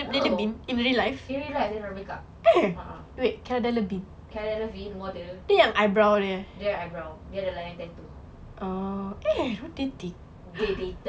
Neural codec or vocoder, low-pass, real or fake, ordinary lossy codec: none; none; real; none